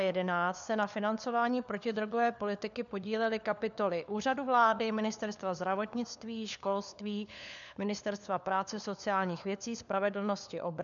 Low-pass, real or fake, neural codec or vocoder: 7.2 kHz; fake; codec, 16 kHz, 4 kbps, FunCodec, trained on LibriTTS, 50 frames a second